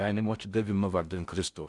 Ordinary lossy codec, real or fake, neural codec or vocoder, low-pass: Opus, 64 kbps; fake; codec, 16 kHz in and 24 kHz out, 0.6 kbps, FocalCodec, streaming, 4096 codes; 10.8 kHz